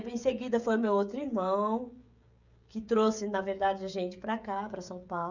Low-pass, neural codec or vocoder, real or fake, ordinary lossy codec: 7.2 kHz; codec, 16 kHz, 16 kbps, FreqCodec, smaller model; fake; none